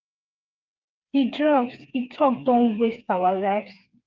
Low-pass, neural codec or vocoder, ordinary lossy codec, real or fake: 7.2 kHz; codec, 16 kHz, 4 kbps, FreqCodec, smaller model; Opus, 32 kbps; fake